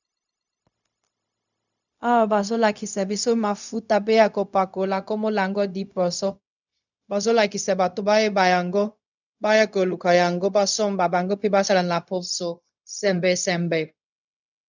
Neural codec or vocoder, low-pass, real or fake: codec, 16 kHz, 0.4 kbps, LongCat-Audio-Codec; 7.2 kHz; fake